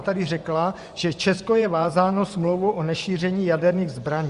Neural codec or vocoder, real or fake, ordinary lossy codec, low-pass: vocoder, 24 kHz, 100 mel bands, Vocos; fake; Opus, 64 kbps; 10.8 kHz